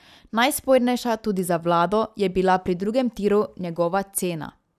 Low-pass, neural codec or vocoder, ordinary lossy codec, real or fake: 14.4 kHz; none; none; real